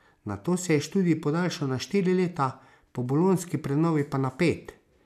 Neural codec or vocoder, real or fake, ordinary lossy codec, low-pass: none; real; none; 14.4 kHz